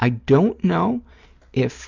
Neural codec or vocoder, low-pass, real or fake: none; 7.2 kHz; real